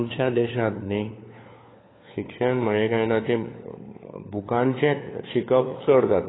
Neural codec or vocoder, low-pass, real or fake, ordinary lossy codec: codec, 16 kHz, 4 kbps, FunCodec, trained on LibriTTS, 50 frames a second; 7.2 kHz; fake; AAC, 16 kbps